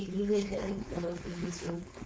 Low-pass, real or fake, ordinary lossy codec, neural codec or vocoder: none; fake; none; codec, 16 kHz, 4.8 kbps, FACodec